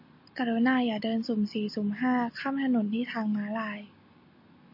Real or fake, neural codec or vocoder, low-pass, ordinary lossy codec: real; none; 5.4 kHz; MP3, 32 kbps